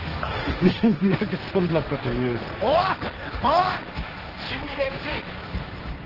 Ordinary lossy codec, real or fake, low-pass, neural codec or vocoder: Opus, 16 kbps; fake; 5.4 kHz; codec, 16 kHz, 1.1 kbps, Voila-Tokenizer